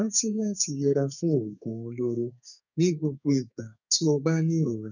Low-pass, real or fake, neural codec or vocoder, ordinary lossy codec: 7.2 kHz; fake; codec, 32 kHz, 1.9 kbps, SNAC; none